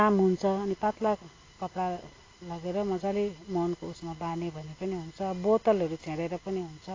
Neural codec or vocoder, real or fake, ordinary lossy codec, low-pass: none; real; AAC, 32 kbps; 7.2 kHz